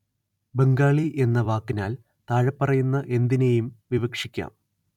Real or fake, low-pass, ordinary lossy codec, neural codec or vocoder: fake; 19.8 kHz; none; vocoder, 44.1 kHz, 128 mel bands every 256 samples, BigVGAN v2